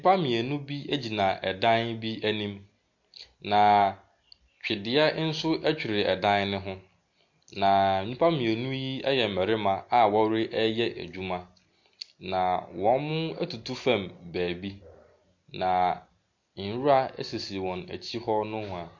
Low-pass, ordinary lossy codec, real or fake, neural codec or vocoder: 7.2 kHz; MP3, 48 kbps; real; none